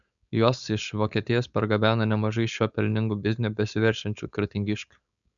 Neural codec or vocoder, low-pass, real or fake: codec, 16 kHz, 4.8 kbps, FACodec; 7.2 kHz; fake